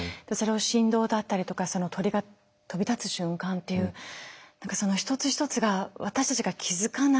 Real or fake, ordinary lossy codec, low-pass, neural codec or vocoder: real; none; none; none